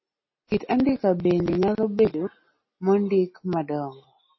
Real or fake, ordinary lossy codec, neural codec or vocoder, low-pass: real; MP3, 24 kbps; none; 7.2 kHz